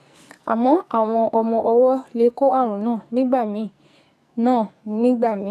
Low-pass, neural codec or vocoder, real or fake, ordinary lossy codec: 14.4 kHz; codec, 44.1 kHz, 2.6 kbps, SNAC; fake; none